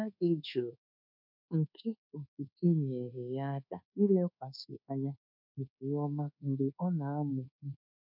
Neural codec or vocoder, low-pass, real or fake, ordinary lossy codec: codec, 24 kHz, 1.2 kbps, DualCodec; 5.4 kHz; fake; none